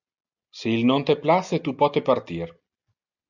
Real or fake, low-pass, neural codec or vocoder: real; 7.2 kHz; none